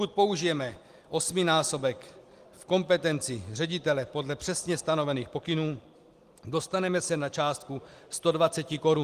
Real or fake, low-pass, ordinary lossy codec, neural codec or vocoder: real; 14.4 kHz; Opus, 24 kbps; none